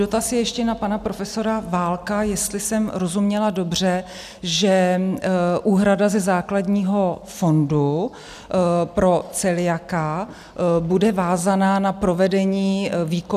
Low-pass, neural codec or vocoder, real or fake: 14.4 kHz; none; real